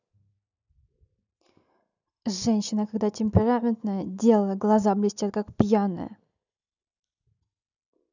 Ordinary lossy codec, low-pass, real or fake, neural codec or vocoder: none; 7.2 kHz; real; none